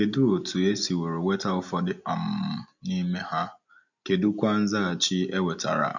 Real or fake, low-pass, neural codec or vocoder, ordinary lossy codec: real; 7.2 kHz; none; none